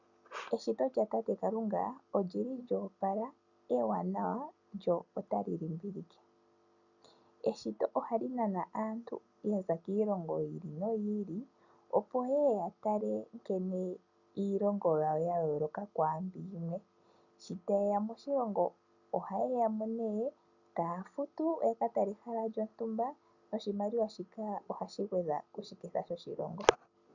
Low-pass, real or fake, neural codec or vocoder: 7.2 kHz; real; none